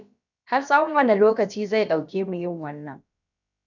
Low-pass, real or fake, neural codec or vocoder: 7.2 kHz; fake; codec, 16 kHz, about 1 kbps, DyCAST, with the encoder's durations